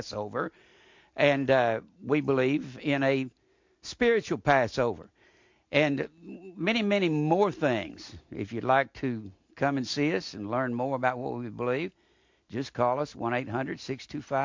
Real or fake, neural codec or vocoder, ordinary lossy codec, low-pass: real; none; MP3, 48 kbps; 7.2 kHz